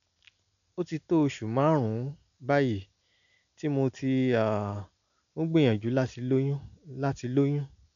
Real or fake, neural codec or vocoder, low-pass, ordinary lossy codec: real; none; 7.2 kHz; none